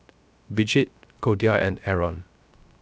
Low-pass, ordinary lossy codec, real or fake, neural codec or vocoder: none; none; fake; codec, 16 kHz, 0.3 kbps, FocalCodec